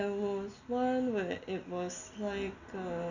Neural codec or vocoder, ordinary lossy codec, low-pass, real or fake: none; none; 7.2 kHz; real